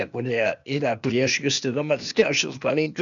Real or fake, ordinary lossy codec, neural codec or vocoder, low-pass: fake; MP3, 96 kbps; codec, 16 kHz, 0.8 kbps, ZipCodec; 7.2 kHz